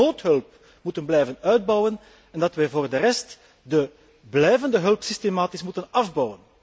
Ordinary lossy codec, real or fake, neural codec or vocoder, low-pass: none; real; none; none